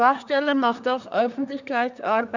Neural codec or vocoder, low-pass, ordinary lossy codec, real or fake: codec, 24 kHz, 1 kbps, SNAC; 7.2 kHz; none; fake